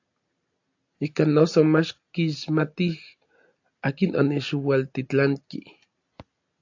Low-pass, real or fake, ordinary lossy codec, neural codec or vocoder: 7.2 kHz; real; AAC, 48 kbps; none